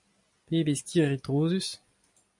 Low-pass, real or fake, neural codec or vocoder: 10.8 kHz; real; none